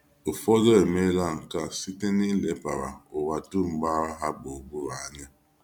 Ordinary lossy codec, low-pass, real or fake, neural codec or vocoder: none; 19.8 kHz; real; none